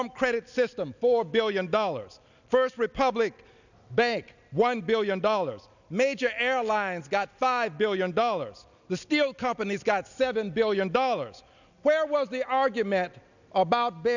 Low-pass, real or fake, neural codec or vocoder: 7.2 kHz; real; none